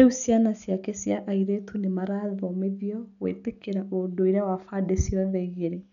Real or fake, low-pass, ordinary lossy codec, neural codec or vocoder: real; 7.2 kHz; none; none